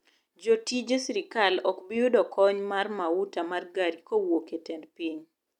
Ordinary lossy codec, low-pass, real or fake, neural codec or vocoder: none; 19.8 kHz; real; none